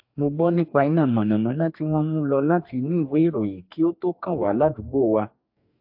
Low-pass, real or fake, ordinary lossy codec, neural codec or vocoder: 5.4 kHz; fake; AAC, 48 kbps; codec, 44.1 kHz, 2.6 kbps, DAC